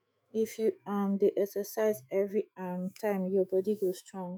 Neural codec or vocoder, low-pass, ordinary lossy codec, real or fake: autoencoder, 48 kHz, 128 numbers a frame, DAC-VAE, trained on Japanese speech; none; none; fake